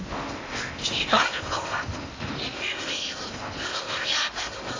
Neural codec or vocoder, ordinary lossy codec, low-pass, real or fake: codec, 16 kHz in and 24 kHz out, 0.8 kbps, FocalCodec, streaming, 65536 codes; AAC, 32 kbps; 7.2 kHz; fake